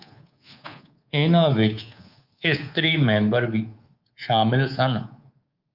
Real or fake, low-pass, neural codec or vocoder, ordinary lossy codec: fake; 5.4 kHz; codec, 24 kHz, 3.1 kbps, DualCodec; Opus, 32 kbps